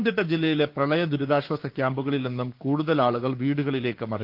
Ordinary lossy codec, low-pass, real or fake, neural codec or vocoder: Opus, 32 kbps; 5.4 kHz; fake; codec, 16 kHz, 6 kbps, DAC